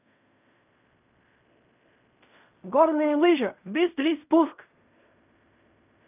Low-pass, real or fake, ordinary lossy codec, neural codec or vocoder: 3.6 kHz; fake; none; codec, 16 kHz in and 24 kHz out, 0.4 kbps, LongCat-Audio-Codec, fine tuned four codebook decoder